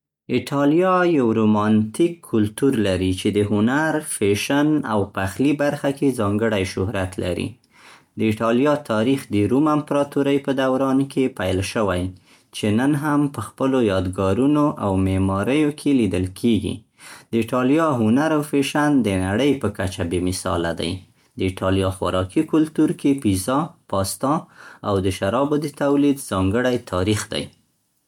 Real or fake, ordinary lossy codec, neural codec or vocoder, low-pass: real; MP3, 96 kbps; none; 19.8 kHz